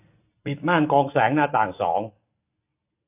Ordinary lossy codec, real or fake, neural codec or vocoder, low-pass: AAC, 24 kbps; real; none; 3.6 kHz